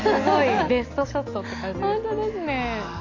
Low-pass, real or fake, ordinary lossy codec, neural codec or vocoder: 7.2 kHz; real; AAC, 32 kbps; none